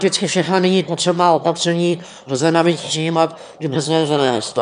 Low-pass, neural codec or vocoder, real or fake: 9.9 kHz; autoencoder, 22.05 kHz, a latent of 192 numbers a frame, VITS, trained on one speaker; fake